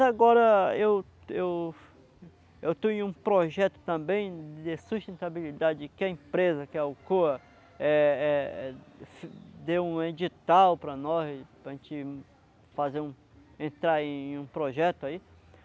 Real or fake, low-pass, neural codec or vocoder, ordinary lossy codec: real; none; none; none